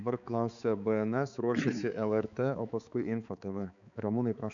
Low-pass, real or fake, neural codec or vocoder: 7.2 kHz; fake; codec, 16 kHz, 4 kbps, X-Codec, HuBERT features, trained on balanced general audio